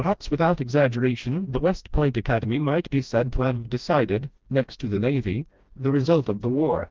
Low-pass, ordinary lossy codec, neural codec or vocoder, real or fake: 7.2 kHz; Opus, 32 kbps; codec, 16 kHz, 1 kbps, FreqCodec, smaller model; fake